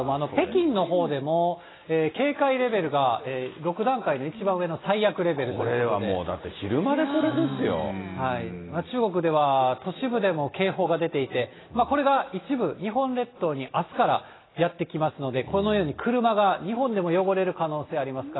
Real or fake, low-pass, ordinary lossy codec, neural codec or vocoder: real; 7.2 kHz; AAC, 16 kbps; none